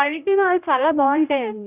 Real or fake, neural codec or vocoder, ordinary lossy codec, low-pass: fake; codec, 16 kHz, 0.5 kbps, X-Codec, HuBERT features, trained on general audio; none; 3.6 kHz